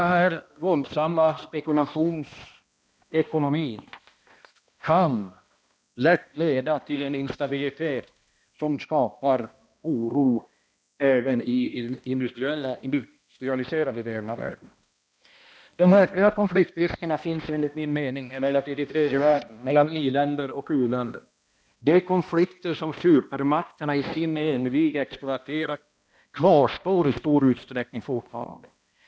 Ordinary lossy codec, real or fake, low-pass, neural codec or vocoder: none; fake; none; codec, 16 kHz, 1 kbps, X-Codec, HuBERT features, trained on balanced general audio